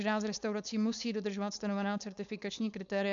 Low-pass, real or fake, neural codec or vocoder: 7.2 kHz; fake; codec, 16 kHz, 4.8 kbps, FACodec